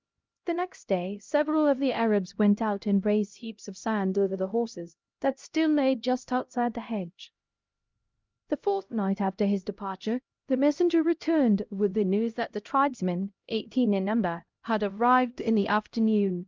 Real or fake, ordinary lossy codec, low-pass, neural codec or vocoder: fake; Opus, 24 kbps; 7.2 kHz; codec, 16 kHz, 0.5 kbps, X-Codec, HuBERT features, trained on LibriSpeech